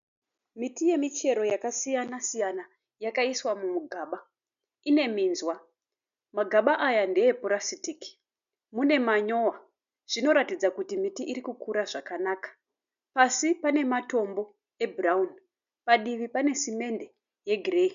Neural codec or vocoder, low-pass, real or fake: none; 7.2 kHz; real